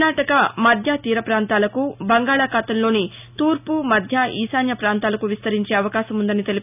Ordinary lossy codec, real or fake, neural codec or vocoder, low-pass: none; real; none; 3.6 kHz